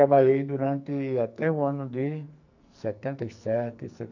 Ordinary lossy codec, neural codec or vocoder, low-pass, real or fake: none; codec, 44.1 kHz, 2.6 kbps, SNAC; 7.2 kHz; fake